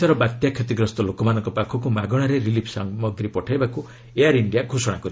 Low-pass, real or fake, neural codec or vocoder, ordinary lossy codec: none; real; none; none